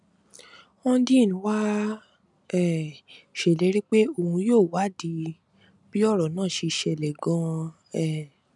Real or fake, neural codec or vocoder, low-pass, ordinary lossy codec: real; none; 10.8 kHz; none